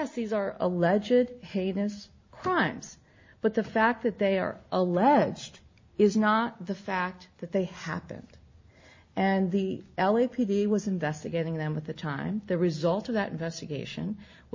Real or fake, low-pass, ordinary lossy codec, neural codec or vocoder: real; 7.2 kHz; MP3, 32 kbps; none